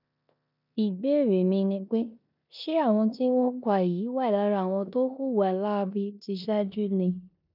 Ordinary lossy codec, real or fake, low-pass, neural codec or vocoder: none; fake; 5.4 kHz; codec, 16 kHz in and 24 kHz out, 0.9 kbps, LongCat-Audio-Codec, four codebook decoder